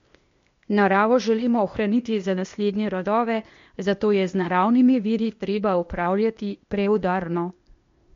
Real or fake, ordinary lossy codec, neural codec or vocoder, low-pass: fake; MP3, 48 kbps; codec, 16 kHz, 0.8 kbps, ZipCodec; 7.2 kHz